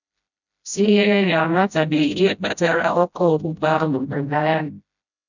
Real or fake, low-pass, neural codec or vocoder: fake; 7.2 kHz; codec, 16 kHz, 0.5 kbps, FreqCodec, smaller model